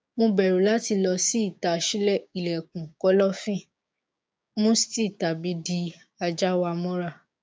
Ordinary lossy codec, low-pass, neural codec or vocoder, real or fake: none; none; codec, 16 kHz, 6 kbps, DAC; fake